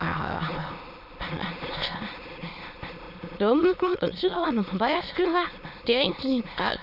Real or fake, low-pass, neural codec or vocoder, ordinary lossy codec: fake; 5.4 kHz; autoencoder, 22.05 kHz, a latent of 192 numbers a frame, VITS, trained on many speakers; none